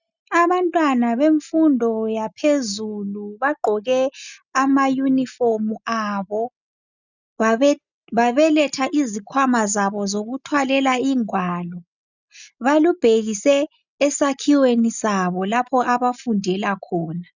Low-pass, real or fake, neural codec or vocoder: 7.2 kHz; real; none